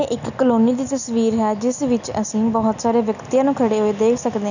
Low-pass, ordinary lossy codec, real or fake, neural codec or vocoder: 7.2 kHz; none; real; none